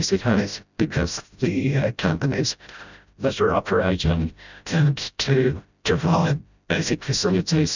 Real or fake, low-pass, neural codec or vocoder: fake; 7.2 kHz; codec, 16 kHz, 0.5 kbps, FreqCodec, smaller model